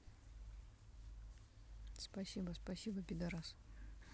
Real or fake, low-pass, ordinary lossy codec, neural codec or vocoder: real; none; none; none